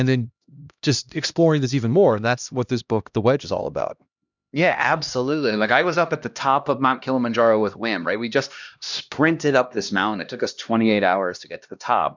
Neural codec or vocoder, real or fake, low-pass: codec, 16 kHz, 1 kbps, X-Codec, HuBERT features, trained on LibriSpeech; fake; 7.2 kHz